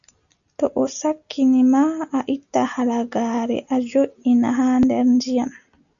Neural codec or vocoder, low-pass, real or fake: none; 7.2 kHz; real